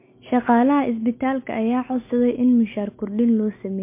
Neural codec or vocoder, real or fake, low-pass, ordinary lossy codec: none; real; 3.6 kHz; MP3, 24 kbps